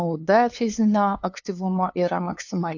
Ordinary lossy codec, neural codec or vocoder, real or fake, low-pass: AAC, 48 kbps; codec, 16 kHz, 2 kbps, FunCodec, trained on LibriTTS, 25 frames a second; fake; 7.2 kHz